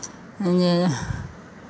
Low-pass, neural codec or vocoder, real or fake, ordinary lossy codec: none; none; real; none